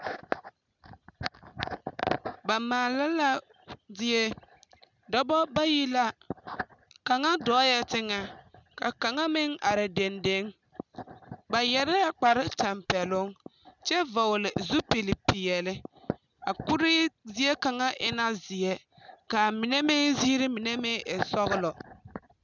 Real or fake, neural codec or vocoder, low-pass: real; none; 7.2 kHz